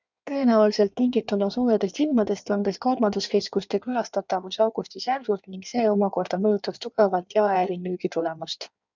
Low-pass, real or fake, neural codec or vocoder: 7.2 kHz; fake; codec, 16 kHz in and 24 kHz out, 1.1 kbps, FireRedTTS-2 codec